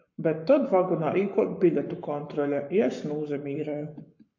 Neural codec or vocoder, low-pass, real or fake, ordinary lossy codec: codec, 44.1 kHz, 7.8 kbps, Pupu-Codec; 7.2 kHz; fake; MP3, 48 kbps